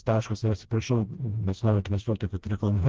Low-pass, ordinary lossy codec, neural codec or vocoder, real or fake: 7.2 kHz; Opus, 16 kbps; codec, 16 kHz, 1 kbps, FreqCodec, smaller model; fake